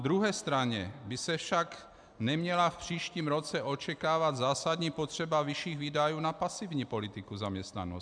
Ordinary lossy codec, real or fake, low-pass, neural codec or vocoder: MP3, 96 kbps; real; 9.9 kHz; none